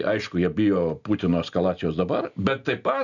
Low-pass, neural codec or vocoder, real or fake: 7.2 kHz; none; real